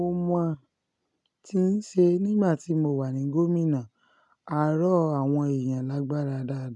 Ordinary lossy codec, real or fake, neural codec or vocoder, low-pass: none; real; none; 10.8 kHz